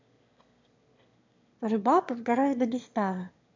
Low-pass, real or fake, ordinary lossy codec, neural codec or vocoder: 7.2 kHz; fake; MP3, 64 kbps; autoencoder, 22.05 kHz, a latent of 192 numbers a frame, VITS, trained on one speaker